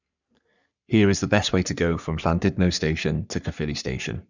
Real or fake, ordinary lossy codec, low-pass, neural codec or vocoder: fake; none; 7.2 kHz; codec, 44.1 kHz, 3.4 kbps, Pupu-Codec